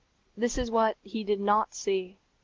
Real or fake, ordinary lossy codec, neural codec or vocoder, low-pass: real; Opus, 16 kbps; none; 7.2 kHz